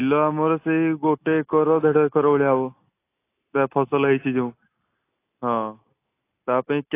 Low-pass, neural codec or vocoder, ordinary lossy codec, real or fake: 3.6 kHz; none; AAC, 24 kbps; real